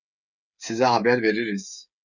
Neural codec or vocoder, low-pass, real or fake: codec, 16 kHz, 8 kbps, FreqCodec, smaller model; 7.2 kHz; fake